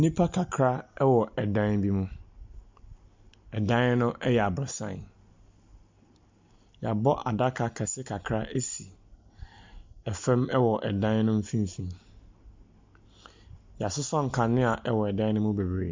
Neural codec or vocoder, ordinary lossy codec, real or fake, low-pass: none; MP3, 64 kbps; real; 7.2 kHz